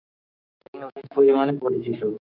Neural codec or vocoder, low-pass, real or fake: codec, 16 kHz, 6 kbps, DAC; 5.4 kHz; fake